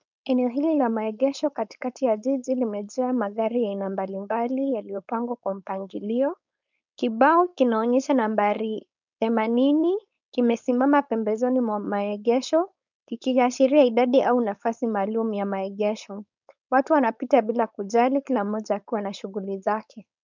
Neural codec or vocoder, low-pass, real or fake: codec, 16 kHz, 4.8 kbps, FACodec; 7.2 kHz; fake